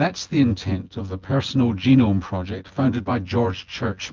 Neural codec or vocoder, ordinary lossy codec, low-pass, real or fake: vocoder, 24 kHz, 100 mel bands, Vocos; Opus, 24 kbps; 7.2 kHz; fake